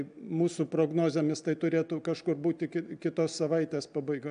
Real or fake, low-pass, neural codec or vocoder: real; 9.9 kHz; none